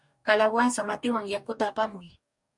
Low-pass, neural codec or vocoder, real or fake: 10.8 kHz; codec, 44.1 kHz, 2.6 kbps, DAC; fake